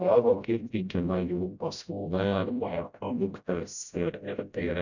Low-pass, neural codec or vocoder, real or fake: 7.2 kHz; codec, 16 kHz, 0.5 kbps, FreqCodec, smaller model; fake